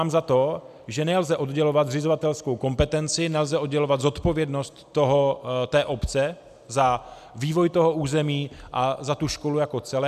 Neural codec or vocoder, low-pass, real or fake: none; 14.4 kHz; real